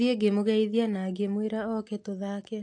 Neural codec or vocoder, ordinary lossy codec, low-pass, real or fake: none; AAC, 48 kbps; 9.9 kHz; real